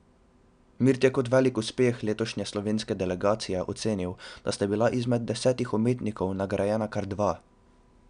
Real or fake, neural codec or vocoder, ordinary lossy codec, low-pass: real; none; none; 9.9 kHz